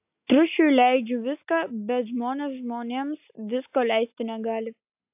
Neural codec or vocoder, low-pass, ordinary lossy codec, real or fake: none; 3.6 kHz; AAC, 32 kbps; real